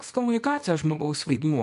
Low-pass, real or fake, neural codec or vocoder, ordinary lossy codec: 10.8 kHz; fake; codec, 24 kHz, 0.9 kbps, WavTokenizer, small release; MP3, 64 kbps